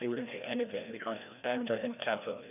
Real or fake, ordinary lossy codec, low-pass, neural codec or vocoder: fake; none; 3.6 kHz; codec, 16 kHz, 1 kbps, FreqCodec, larger model